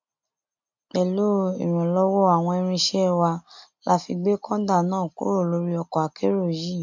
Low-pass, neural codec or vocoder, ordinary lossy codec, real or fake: 7.2 kHz; none; MP3, 64 kbps; real